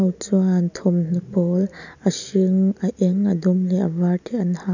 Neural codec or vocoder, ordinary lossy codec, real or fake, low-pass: none; none; real; 7.2 kHz